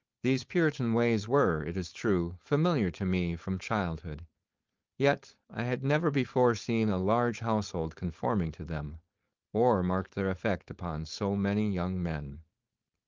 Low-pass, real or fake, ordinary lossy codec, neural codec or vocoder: 7.2 kHz; fake; Opus, 32 kbps; codec, 16 kHz, 4.8 kbps, FACodec